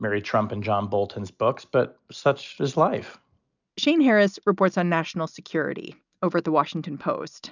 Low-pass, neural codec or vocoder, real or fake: 7.2 kHz; none; real